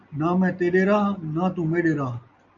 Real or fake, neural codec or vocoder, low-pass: real; none; 7.2 kHz